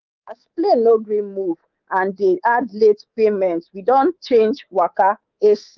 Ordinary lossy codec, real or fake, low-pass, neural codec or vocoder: Opus, 32 kbps; fake; 7.2 kHz; codec, 24 kHz, 3.1 kbps, DualCodec